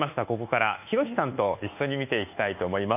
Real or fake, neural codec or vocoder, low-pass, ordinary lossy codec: fake; codec, 24 kHz, 1.2 kbps, DualCodec; 3.6 kHz; none